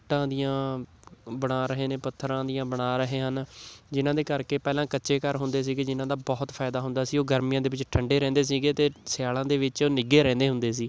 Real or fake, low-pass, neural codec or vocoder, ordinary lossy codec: real; none; none; none